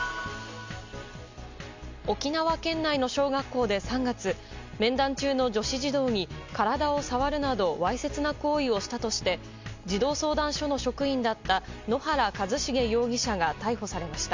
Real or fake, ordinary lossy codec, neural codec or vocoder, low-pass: real; MP3, 64 kbps; none; 7.2 kHz